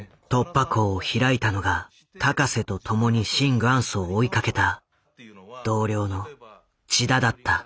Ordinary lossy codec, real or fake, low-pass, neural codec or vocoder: none; real; none; none